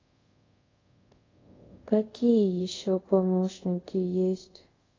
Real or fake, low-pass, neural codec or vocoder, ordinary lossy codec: fake; 7.2 kHz; codec, 24 kHz, 0.5 kbps, DualCodec; AAC, 32 kbps